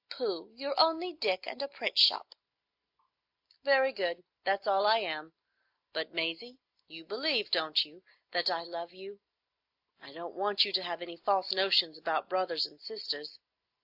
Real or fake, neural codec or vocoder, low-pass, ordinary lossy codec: real; none; 5.4 kHz; AAC, 48 kbps